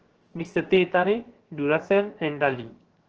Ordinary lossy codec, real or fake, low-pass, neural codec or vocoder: Opus, 16 kbps; fake; 7.2 kHz; codec, 16 kHz, 0.7 kbps, FocalCodec